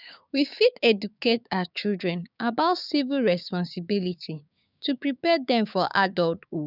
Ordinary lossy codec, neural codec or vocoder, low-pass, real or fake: none; codec, 16 kHz, 6 kbps, DAC; 5.4 kHz; fake